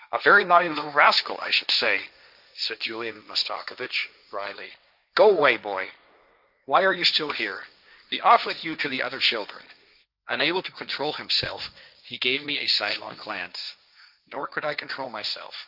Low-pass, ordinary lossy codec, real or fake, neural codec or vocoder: 5.4 kHz; Opus, 64 kbps; fake; codec, 16 kHz, 1.1 kbps, Voila-Tokenizer